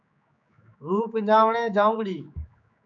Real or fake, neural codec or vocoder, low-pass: fake; codec, 16 kHz, 4 kbps, X-Codec, HuBERT features, trained on general audio; 7.2 kHz